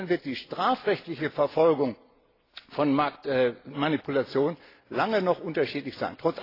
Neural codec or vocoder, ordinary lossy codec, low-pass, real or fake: none; AAC, 24 kbps; 5.4 kHz; real